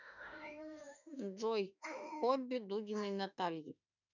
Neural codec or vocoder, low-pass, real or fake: autoencoder, 48 kHz, 32 numbers a frame, DAC-VAE, trained on Japanese speech; 7.2 kHz; fake